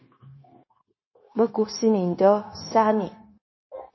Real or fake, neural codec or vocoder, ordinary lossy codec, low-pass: fake; codec, 16 kHz, 0.9 kbps, LongCat-Audio-Codec; MP3, 24 kbps; 7.2 kHz